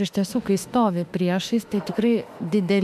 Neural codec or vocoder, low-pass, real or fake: autoencoder, 48 kHz, 32 numbers a frame, DAC-VAE, trained on Japanese speech; 14.4 kHz; fake